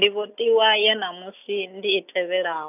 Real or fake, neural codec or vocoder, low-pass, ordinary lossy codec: real; none; 3.6 kHz; none